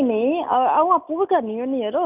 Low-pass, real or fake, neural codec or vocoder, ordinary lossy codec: 3.6 kHz; real; none; none